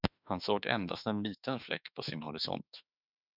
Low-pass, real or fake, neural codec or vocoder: 5.4 kHz; fake; autoencoder, 48 kHz, 32 numbers a frame, DAC-VAE, trained on Japanese speech